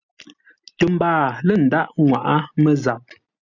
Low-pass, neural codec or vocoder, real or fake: 7.2 kHz; none; real